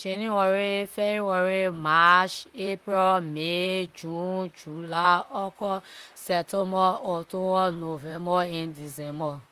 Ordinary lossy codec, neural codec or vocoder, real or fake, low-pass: Opus, 32 kbps; vocoder, 44.1 kHz, 128 mel bands, Pupu-Vocoder; fake; 14.4 kHz